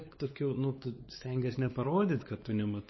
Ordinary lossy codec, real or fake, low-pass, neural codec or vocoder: MP3, 24 kbps; fake; 7.2 kHz; codec, 16 kHz, 4 kbps, X-Codec, WavLM features, trained on Multilingual LibriSpeech